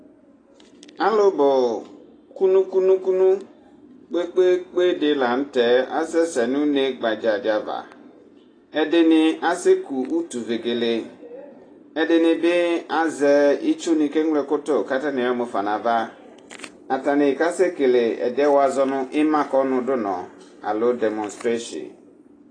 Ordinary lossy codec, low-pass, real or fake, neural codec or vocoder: AAC, 32 kbps; 9.9 kHz; real; none